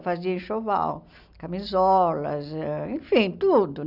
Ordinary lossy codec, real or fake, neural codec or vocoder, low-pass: none; real; none; 5.4 kHz